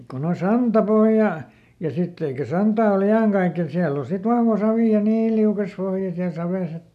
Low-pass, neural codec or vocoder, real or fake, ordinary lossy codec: 14.4 kHz; none; real; none